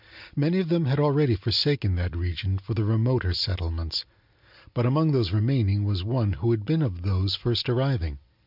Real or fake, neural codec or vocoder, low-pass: real; none; 5.4 kHz